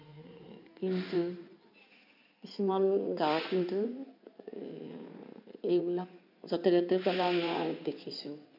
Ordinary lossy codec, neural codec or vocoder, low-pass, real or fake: none; codec, 16 kHz in and 24 kHz out, 2.2 kbps, FireRedTTS-2 codec; 5.4 kHz; fake